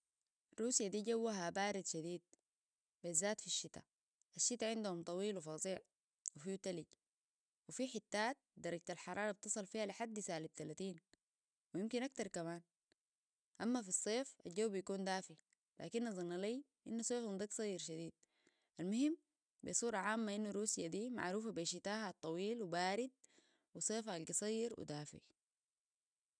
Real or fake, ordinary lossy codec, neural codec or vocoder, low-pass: real; none; none; 9.9 kHz